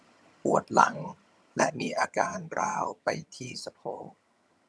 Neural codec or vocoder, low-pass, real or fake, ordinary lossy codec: vocoder, 22.05 kHz, 80 mel bands, HiFi-GAN; none; fake; none